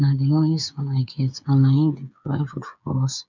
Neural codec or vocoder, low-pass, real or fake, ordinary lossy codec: codec, 16 kHz, 8 kbps, FreqCodec, smaller model; 7.2 kHz; fake; none